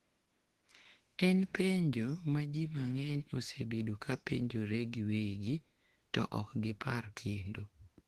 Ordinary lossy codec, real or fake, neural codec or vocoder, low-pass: Opus, 16 kbps; fake; autoencoder, 48 kHz, 32 numbers a frame, DAC-VAE, trained on Japanese speech; 14.4 kHz